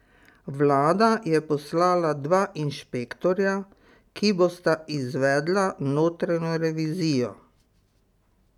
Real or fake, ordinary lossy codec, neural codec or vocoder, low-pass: real; none; none; 19.8 kHz